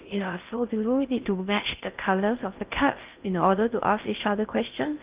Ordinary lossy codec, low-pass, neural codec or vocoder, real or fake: Opus, 24 kbps; 3.6 kHz; codec, 16 kHz in and 24 kHz out, 0.6 kbps, FocalCodec, streaming, 4096 codes; fake